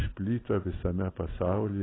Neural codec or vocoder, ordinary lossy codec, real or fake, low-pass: none; AAC, 16 kbps; real; 7.2 kHz